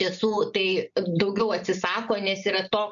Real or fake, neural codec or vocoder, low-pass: real; none; 7.2 kHz